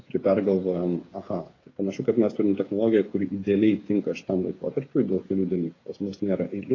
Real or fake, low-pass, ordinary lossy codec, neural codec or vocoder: fake; 7.2 kHz; AAC, 32 kbps; codec, 16 kHz, 8 kbps, FreqCodec, smaller model